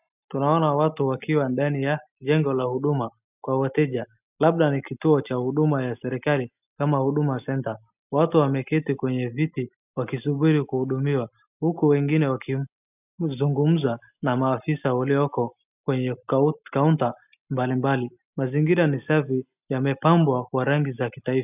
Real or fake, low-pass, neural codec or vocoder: real; 3.6 kHz; none